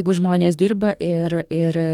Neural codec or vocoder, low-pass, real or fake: codec, 44.1 kHz, 2.6 kbps, DAC; 19.8 kHz; fake